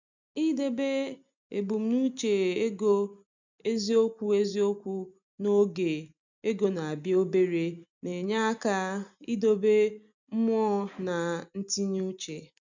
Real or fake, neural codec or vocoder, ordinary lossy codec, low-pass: real; none; none; 7.2 kHz